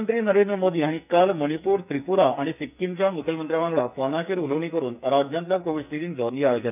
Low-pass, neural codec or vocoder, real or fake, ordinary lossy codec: 3.6 kHz; codec, 44.1 kHz, 2.6 kbps, SNAC; fake; none